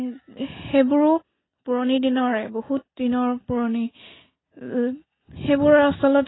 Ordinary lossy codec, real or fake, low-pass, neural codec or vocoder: AAC, 16 kbps; real; 7.2 kHz; none